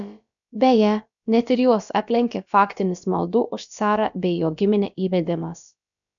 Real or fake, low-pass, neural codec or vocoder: fake; 7.2 kHz; codec, 16 kHz, about 1 kbps, DyCAST, with the encoder's durations